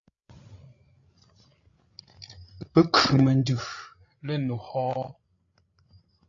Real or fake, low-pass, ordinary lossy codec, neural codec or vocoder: fake; 7.2 kHz; AAC, 32 kbps; codec, 16 kHz, 16 kbps, FreqCodec, larger model